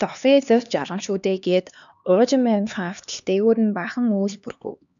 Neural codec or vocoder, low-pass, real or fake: codec, 16 kHz, 2 kbps, X-Codec, HuBERT features, trained on LibriSpeech; 7.2 kHz; fake